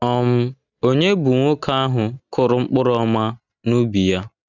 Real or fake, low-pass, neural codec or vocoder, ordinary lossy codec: real; 7.2 kHz; none; none